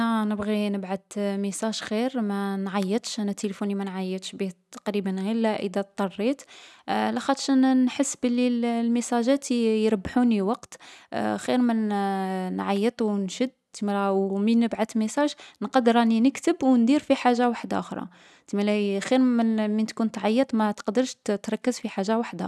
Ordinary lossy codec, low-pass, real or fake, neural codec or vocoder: none; none; real; none